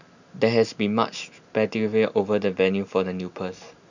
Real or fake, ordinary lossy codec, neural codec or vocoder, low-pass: real; none; none; 7.2 kHz